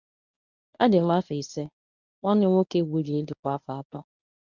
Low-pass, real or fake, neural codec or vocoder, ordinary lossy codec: 7.2 kHz; fake; codec, 24 kHz, 0.9 kbps, WavTokenizer, medium speech release version 1; none